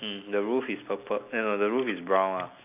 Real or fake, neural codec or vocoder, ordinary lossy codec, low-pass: real; none; none; 3.6 kHz